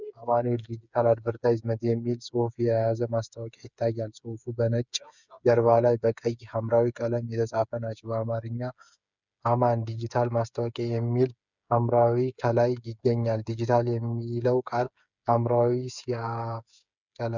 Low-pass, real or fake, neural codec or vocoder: 7.2 kHz; fake; codec, 16 kHz, 8 kbps, FreqCodec, smaller model